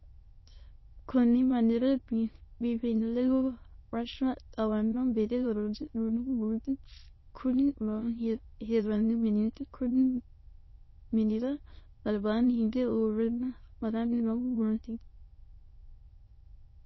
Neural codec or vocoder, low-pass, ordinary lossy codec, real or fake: autoencoder, 22.05 kHz, a latent of 192 numbers a frame, VITS, trained on many speakers; 7.2 kHz; MP3, 24 kbps; fake